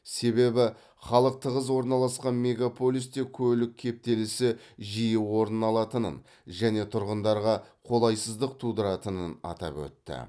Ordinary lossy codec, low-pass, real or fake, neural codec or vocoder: none; none; real; none